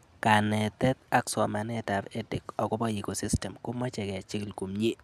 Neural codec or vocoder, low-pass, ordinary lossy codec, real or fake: none; 14.4 kHz; none; real